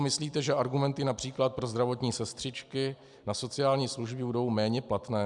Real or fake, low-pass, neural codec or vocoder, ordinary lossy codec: real; 10.8 kHz; none; MP3, 96 kbps